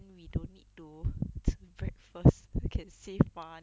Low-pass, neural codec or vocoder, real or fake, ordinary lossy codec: none; none; real; none